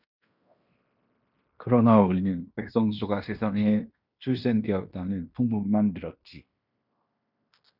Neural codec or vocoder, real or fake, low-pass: codec, 16 kHz in and 24 kHz out, 0.9 kbps, LongCat-Audio-Codec, fine tuned four codebook decoder; fake; 5.4 kHz